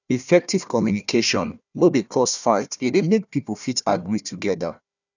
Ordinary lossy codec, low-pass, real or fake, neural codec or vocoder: none; 7.2 kHz; fake; codec, 16 kHz, 1 kbps, FunCodec, trained on Chinese and English, 50 frames a second